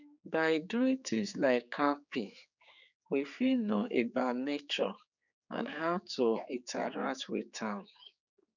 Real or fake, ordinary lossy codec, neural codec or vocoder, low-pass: fake; none; codec, 16 kHz, 4 kbps, X-Codec, HuBERT features, trained on general audio; 7.2 kHz